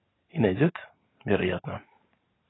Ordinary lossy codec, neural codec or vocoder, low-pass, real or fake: AAC, 16 kbps; none; 7.2 kHz; real